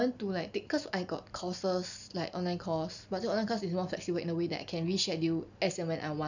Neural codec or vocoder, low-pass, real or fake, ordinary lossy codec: none; 7.2 kHz; real; none